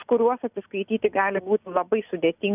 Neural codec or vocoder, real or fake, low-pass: none; real; 3.6 kHz